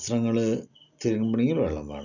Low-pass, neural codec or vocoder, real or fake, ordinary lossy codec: 7.2 kHz; none; real; none